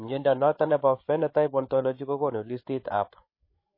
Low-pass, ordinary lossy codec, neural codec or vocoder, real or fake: 5.4 kHz; MP3, 24 kbps; codec, 16 kHz, 8 kbps, FreqCodec, larger model; fake